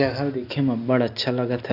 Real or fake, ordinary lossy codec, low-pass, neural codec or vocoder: real; none; 5.4 kHz; none